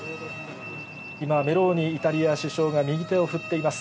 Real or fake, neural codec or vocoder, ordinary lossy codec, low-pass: real; none; none; none